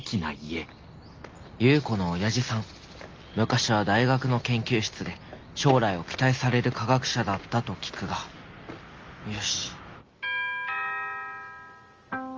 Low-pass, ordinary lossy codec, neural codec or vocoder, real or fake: 7.2 kHz; Opus, 24 kbps; none; real